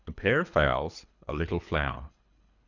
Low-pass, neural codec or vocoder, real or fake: 7.2 kHz; codec, 24 kHz, 3 kbps, HILCodec; fake